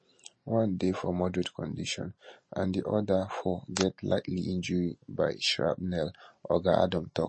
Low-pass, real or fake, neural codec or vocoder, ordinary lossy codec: 9.9 kHz; real; none; MP3, 32 kbps